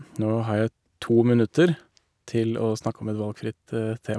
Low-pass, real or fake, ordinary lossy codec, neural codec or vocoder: none; real; none; none